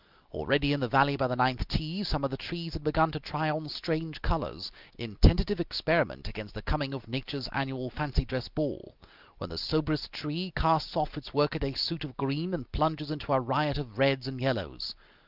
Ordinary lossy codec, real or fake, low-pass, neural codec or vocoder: Opus, 24 kbps; real; 5.4 kHz; none